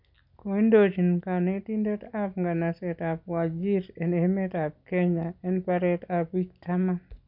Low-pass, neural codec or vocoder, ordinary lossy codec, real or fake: 5.4 kHz; none; none; real